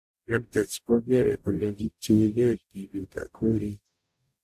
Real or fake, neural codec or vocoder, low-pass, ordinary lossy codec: fake; codec, 44.1 kHz, 0.9 kbps, DAC; 14.4 kHz; AAC, 96 kbps